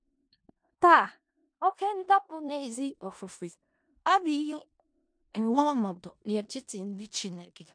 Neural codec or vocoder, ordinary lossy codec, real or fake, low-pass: codec, 16 kHz in and 24 kHz out, 0.4 kbps, LongCat-Audio-Codec, four codebook decoder; MP3, 64 kbps; fake; 9.9 kHz